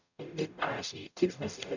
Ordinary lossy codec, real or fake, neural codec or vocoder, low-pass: none; fake; codec, 44.1 kHz, 0.9 kbps, DAC; 7.2 kHz